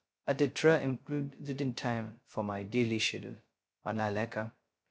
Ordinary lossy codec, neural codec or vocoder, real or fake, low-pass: none; codec, 16 kHz, 0.2 kbps, FocalCodec; fake; none